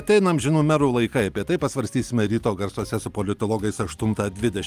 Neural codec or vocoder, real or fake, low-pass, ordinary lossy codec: none; real; 14.4 kHz; Opus, 32 kbps